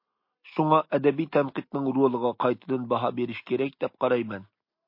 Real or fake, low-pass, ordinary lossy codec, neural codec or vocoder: real; 5.4 kHz; MP3, 32 kbps; none